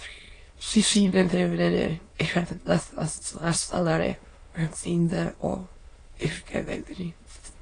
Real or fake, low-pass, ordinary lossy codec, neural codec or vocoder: fake; 9.9 kHz; AAC, 32 kbps; autoencoder, 22.05 kHz, a latent of 192 numbers a frame, VITS, trained on many speakers